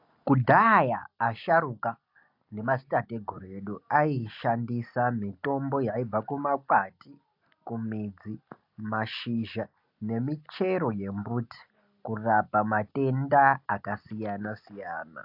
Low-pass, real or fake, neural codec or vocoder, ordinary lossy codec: 5.4 kHz; real; none; AAC, 48 kbps